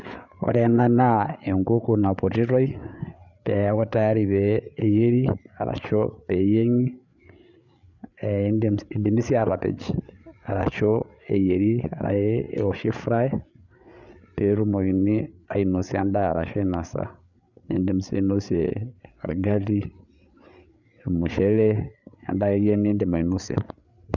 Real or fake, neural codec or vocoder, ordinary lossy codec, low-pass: fake; codec, 16 kHz, 8 kbps, FreqCodec, larger model; none; 7.2 kHz